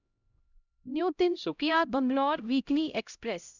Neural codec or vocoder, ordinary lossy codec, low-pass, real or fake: codec, 16 kHz, 0.5 kbps, X-Codec, HuBERT features, trained on LibriSpeech; none; 7.2 kHz; fake